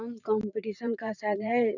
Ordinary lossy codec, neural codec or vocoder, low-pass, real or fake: none; vocoder, 44.1 kHz, 128 mel bands every 512 samples, BigVGAN v2; 7.2 kHz; fake